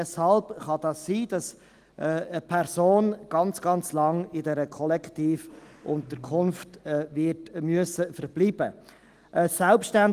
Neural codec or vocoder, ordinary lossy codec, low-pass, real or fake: none; Opus, 24 kbps; 14.4 kHz; real